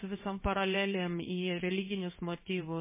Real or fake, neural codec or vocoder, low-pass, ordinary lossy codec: fake; codec, 16 kHz in and 24 kHz out, 1 kbps, XY-Tokenizer; 3.6 kHz; MP3, 16 kbps